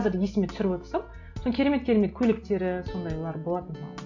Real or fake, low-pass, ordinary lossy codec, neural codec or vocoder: real; 7.2 kHz; none; none